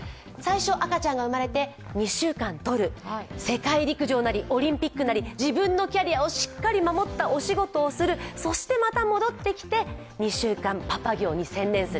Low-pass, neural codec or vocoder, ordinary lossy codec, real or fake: none; none; none; real